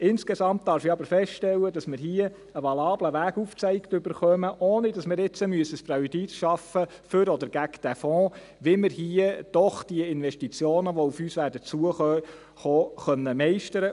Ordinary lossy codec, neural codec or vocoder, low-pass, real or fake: none; none; 10.8 kHz; real